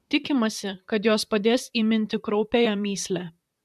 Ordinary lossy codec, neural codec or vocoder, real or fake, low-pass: MP3, 96 kbps; vocoder, 44.1 kHz, 128 mel bands, Pupu-Vocoder; fake; 14.4 kHz